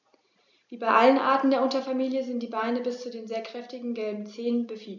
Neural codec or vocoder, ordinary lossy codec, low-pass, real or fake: none; none; 7.2 kHz; real